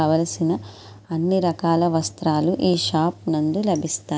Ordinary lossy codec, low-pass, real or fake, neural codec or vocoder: none; none; real; none